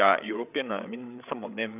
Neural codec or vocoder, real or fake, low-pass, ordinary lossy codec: codec, 16 kHz, 8 kbps, FreqCodec, larger model; fake; 3.6 kHz; none